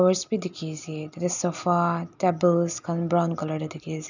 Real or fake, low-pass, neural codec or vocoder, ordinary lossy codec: real; 7.2 kHz; none; none